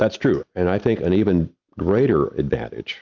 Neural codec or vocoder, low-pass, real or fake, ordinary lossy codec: none; 7.2 kHz; real; Opus, 64 kbps